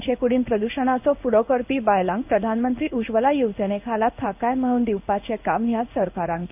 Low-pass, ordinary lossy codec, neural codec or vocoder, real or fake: 3.6 kHz; none; codec, 16 kHz in and 24 kHz out, 1 kbps, XY-Tokenizer; fake